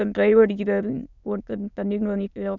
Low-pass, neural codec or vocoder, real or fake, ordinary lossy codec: 7.2 kHz; autoencoder, 22.05 kHz, a latent of 192 numbers a frame, VITS, trained on many speakers; fake; none